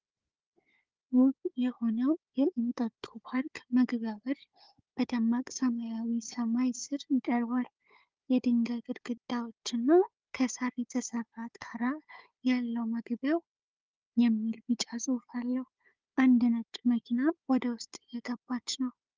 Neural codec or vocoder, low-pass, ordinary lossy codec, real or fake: codec, 16 kHz, 4 kbps, FunCodec, trained on Chinese and English, 50 frames a second; 7.2 kHz; Opus, 32 kbps; fake